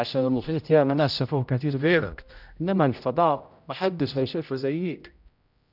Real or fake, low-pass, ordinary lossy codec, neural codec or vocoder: fake; 5.4 kHz; none; codec, 16 kHz, 0.5 kbps, X-Codec, HuBERT features, trained on general audio